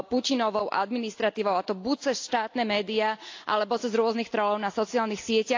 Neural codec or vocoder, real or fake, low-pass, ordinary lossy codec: none; real; 7.2 kHz; AAC, 48 kbps